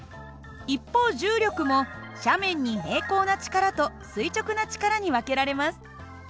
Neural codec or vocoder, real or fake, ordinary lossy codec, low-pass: none; real; none; none